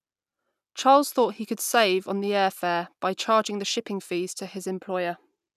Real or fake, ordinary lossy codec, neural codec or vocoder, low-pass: real; none; none; 14.4 kHz